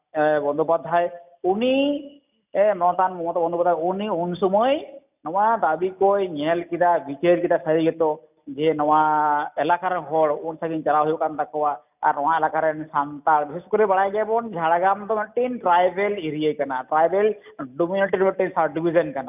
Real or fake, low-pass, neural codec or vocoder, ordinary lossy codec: real; 3.6 kHz; none; none